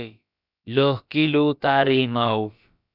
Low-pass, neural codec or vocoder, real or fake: 5.4 kHz; codec, 16 kHz, about 1 kbps, DyCAST, with the encoder's durations; fake